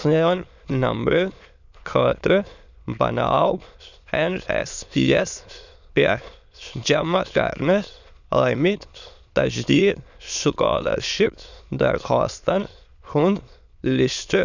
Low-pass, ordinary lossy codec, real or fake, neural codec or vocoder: 7.2 kHz; none; fake; autoencoder, 22.05 kHz, a latent of 192 numbers a frame, VITS, trained on many speakers